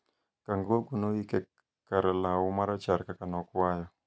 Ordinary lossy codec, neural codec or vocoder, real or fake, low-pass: none; none; real; none